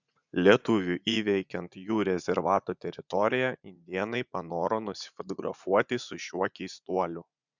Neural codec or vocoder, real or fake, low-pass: vocoder, 44.1 kHz, 80 mel bands, Vocos; fake; 7.2 kHz